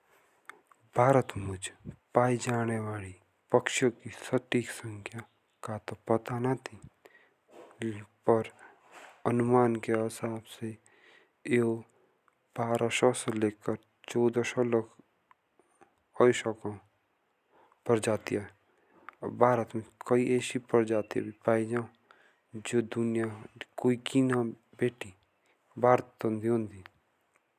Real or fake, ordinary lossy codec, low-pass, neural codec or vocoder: real; none; 14.4 kHz; none